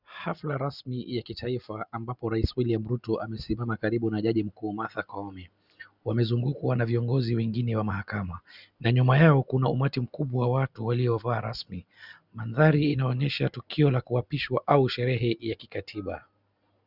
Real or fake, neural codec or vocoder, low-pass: real; none; 5.4 kHz